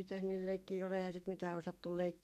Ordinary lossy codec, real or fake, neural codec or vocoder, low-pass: none; fake; codec, 32 kHz, 1.9 kbps, SNAC; 14.4 kHz